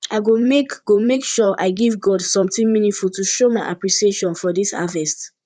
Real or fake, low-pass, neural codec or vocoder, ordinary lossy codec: fake; 9.9 kHz; vocoder, 44.1 kHz, 128 mel bands, Pupu-Vocoder; none